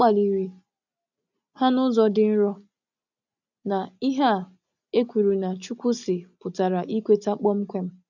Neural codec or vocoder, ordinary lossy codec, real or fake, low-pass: none; none; real; 7.2 kHz